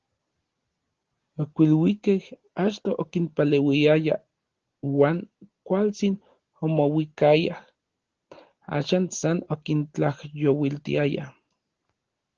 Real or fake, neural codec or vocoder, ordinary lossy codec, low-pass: real; none; Opus, 16 kbps; 7.2 kHz